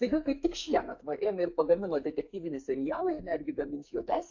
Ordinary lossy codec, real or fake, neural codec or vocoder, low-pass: AAC, 48 kbps; fake; codec, 44.1 kHz, 2.6 kbps, SNAC; 7.2 kHz